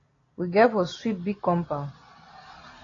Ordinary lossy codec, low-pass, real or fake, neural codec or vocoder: AAC, 32 kbps; 7.2 kHz; real; none